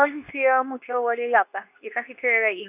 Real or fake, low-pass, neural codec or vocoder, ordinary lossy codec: fake; 3.6 kHz; codec, 24 kHz, 0.9 kbps, WavTokenizer, medium speech release version 2; AAC, 32 kbps